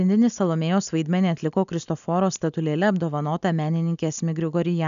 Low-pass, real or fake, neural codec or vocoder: 7.2 kHz; real; none